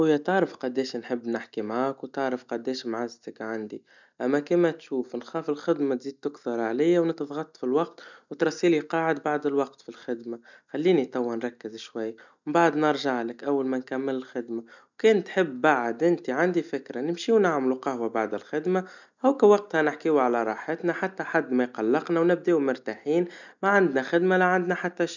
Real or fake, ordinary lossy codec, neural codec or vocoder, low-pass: real; none; none; 7.2 kHz